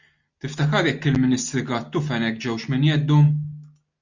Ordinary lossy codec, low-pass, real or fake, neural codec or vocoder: Opus, 64 kbps; 7.2 kHz; real; none